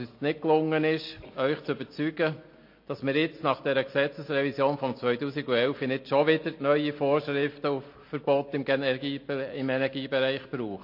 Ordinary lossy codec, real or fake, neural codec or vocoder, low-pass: MP3, 32 kbps; real; none; 5.4 kHz